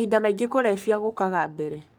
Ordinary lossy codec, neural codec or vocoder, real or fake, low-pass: none; codec, 44.1 kHz, 3.4 kbps, Pupu-Codec; fake; none